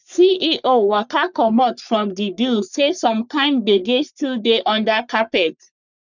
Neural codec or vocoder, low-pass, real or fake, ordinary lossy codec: codec, 44.1 kHz, 3.4 kbps, Pupu-Codec; 7.2 kHz; fake; none